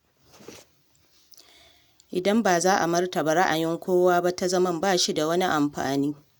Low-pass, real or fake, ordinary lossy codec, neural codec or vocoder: none; real; none; none